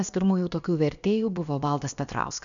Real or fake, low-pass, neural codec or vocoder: fake; 7.2 kHz; codec, 16 kHz, about 1 kbps, DyCAST, with the encoder's durations